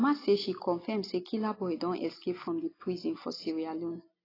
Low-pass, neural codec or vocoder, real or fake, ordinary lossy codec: 5.4 kHz; none; real; AAC, 24 kbps